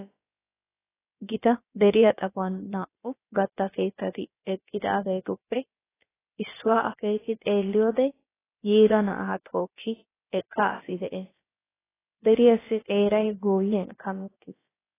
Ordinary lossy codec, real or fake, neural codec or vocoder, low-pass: AAC, 16 kbps; fake; codec, 16 kHz, about 1 kbps, DyCAST, with the encoder's durations; 3.6 kHz